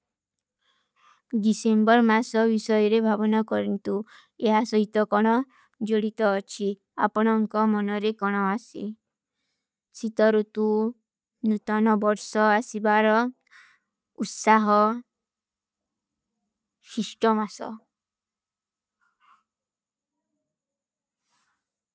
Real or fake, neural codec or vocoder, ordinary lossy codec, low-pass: real; none; none; none